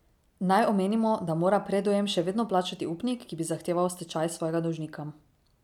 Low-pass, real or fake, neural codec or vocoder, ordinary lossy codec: 19.8 kHz; real; none; none